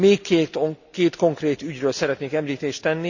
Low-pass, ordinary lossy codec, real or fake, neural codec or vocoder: 7.2 kHz; none; real; none